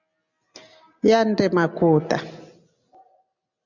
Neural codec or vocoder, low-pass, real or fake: none; 7.2 kHz; real